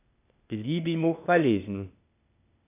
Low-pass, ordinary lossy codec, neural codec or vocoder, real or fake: 3.6 kHz; none; codec, 16 kHz, 0.8 kbps, ZipCodec; fake